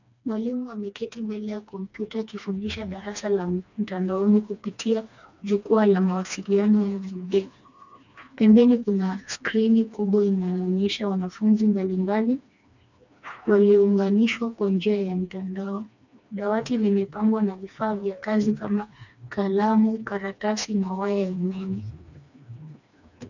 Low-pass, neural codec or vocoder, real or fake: 7.2 kHz; codec, 16 kHz, 2 kbps, FreqCodec, smaller model; fake